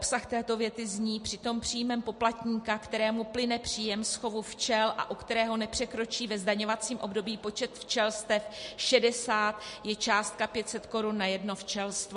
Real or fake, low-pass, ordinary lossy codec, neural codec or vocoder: real; 10.8 kHz; MP3, 48 kbps; none